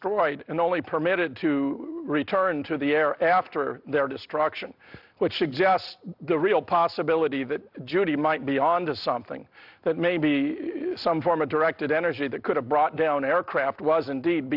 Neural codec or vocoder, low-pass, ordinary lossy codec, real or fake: none; 5.4 kHz; AAC, 48 kbps; real